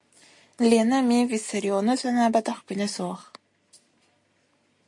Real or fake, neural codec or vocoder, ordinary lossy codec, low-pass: real; none; MP3, 48 kbps; 10.8 kHz